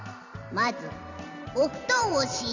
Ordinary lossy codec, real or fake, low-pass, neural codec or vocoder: none; fake; 7.2 kHz; vocoder, 44.1 kHz, 128 mel bands every 512 samples, BigVGAN v2